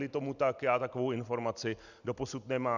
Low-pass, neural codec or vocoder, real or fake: 7.2 kHz; none; real